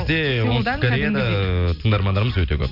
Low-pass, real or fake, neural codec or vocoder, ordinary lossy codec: 5.4 kHz; real; none; none